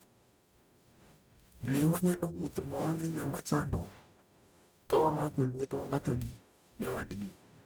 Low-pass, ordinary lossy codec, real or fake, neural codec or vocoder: none; none; fake; codec, 44.1 kHz, 0.9 kbps, DAC